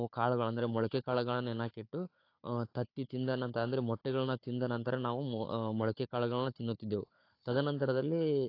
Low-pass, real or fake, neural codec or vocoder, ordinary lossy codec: 5.4 kHz; fake; codec, 16 kHz, 4 kbps, FunCodec, trained on Chinese and English, 50 frames a second; AAC, 32 kbps